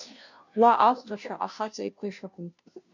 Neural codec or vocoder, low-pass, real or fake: codec, 16 kHz, 0.5 kbps, FunCodec, trained on Chinese and English, 25 frames a second; 7.2 kHz; fake